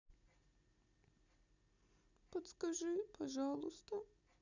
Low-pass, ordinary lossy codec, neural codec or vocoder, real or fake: 7.2 kHz; none; none; real